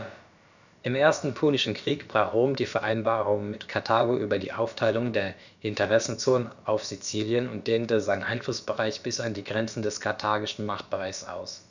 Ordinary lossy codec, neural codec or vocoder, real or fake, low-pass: none; codec, 16 kHz, about 1 kbps, DyCAST, with the encoder's durations; fake; 7.2 kHz